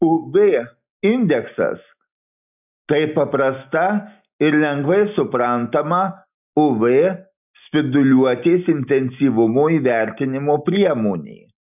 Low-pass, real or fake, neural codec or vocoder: 3.6 kHz; real; none